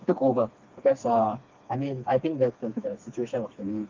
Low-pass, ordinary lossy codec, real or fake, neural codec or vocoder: 7.2 kHz; Opus, 24 kbps; fake; codec, 16 kHz, 2 kbps, FreqCodec, smaller model